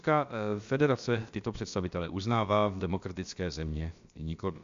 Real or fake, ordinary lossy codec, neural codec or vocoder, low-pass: fake; MP3, 64 kbps; codec, 16 kHz, about 1 kbps, DyCAST, with the encoder's durations; 7.2 kHz